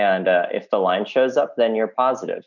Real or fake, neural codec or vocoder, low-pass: real; none; 7.2 kHz